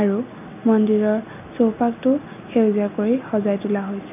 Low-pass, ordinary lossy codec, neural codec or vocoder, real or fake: 3.6 kHz; none; none; real